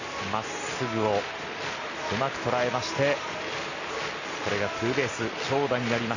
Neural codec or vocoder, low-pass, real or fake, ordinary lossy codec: none; 7.2 kHz; real; none